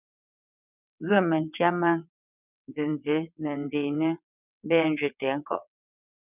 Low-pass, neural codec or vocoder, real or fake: 3.6 kHz; vocoder, 22.05 kHz, 80 mel bands, WaveNeXt; fake